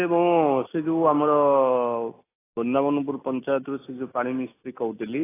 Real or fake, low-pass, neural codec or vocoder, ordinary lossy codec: real; 3.6 kHz; none; AAC, 16 kbps